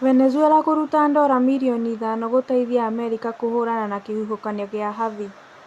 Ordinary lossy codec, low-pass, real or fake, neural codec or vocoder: Opus, 64 kbps; 14.4 kHz; real; none